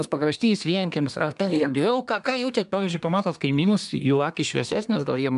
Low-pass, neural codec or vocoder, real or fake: 10.8 kHz; codec, 24 kHz, 1 kbps, SNAC; fake